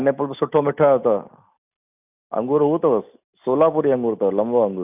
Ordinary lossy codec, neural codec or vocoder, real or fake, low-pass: none; none; real; 3.6 kHz